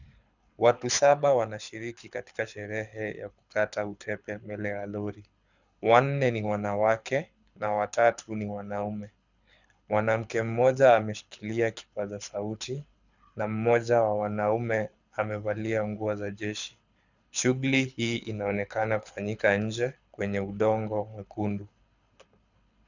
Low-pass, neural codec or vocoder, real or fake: 7.2 kHz; codec, 24 kHz, 6 kbps, HILCodec; fake